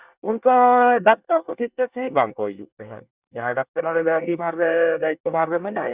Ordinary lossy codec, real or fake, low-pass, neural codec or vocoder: Opus, 64 kbps; fake; 3.6 kHz; codec, 24 kHz, 1 kbps, SNAC